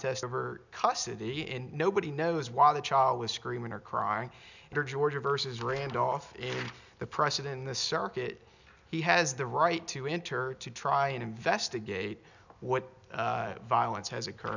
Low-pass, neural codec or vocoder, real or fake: 7.2 kHz; none; real